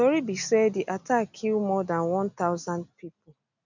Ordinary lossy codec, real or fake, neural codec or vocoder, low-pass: none; real; none; 7.2 kHz